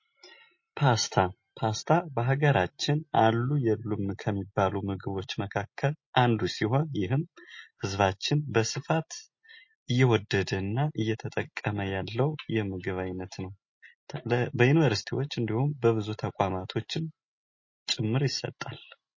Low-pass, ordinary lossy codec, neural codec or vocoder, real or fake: 7.2 kHz; MP3, 32 kbps; none; real